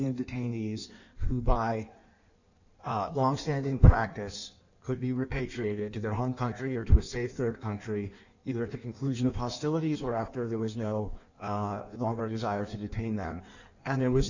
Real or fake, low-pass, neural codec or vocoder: fake; 7.2 kHz; codec, 16 kHz in and 24 kHz out, 1.1 kbps, FireRedTTS-2 codec